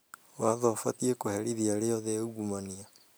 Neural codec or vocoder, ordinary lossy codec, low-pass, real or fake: none; none; none; real